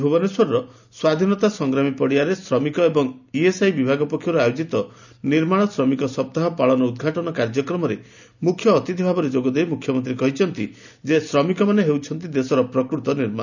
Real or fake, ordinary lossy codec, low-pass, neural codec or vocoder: real; none; 7.2 kHz; none